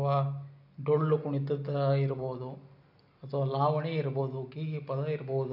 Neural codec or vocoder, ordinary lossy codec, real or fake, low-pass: none; none; real; 5.4 kHz